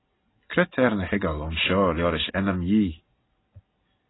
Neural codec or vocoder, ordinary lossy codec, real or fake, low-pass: none; AAC, 16 kbps; real; 7.2 kHz